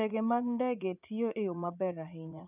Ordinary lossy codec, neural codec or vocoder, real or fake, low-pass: none; vocoder, 44.1 kHz, 80 mel bands, Vocos; fake; 3.6 kHz